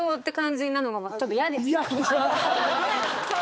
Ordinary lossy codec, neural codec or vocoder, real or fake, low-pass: none; codec, 16 kHz, 4 kbps, X-Codec, HuBERT features, trained on balanced general audio; fake; none